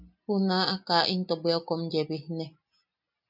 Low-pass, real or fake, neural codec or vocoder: 5.4 kHz; real; none